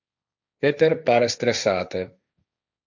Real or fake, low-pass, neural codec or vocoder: fake; 7.2 kHz; codec, 16 kHz, 1.1 kbps, Voila-Tokenizer